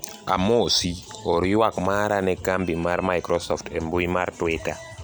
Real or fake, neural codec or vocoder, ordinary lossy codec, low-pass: real; none; none; none